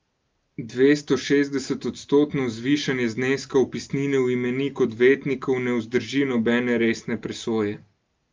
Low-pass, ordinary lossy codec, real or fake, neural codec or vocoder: 7.2 kHz; Opus, 24 kbps; real; none